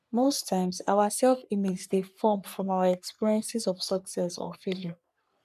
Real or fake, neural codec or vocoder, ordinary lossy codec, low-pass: fake; codec, 44.1 kHz, 3.4 kbps, Pupu-Codec; none; 14.4 kHz